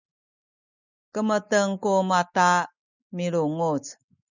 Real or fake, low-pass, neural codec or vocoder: real; 7.2 kHz; none